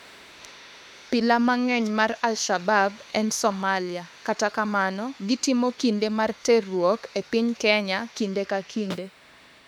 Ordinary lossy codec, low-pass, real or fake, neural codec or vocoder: none; 19.8 kHz; fake; autoencoder, 48 kHz, 32 numbers a frame, DAC-VAE, trained on Japanese speech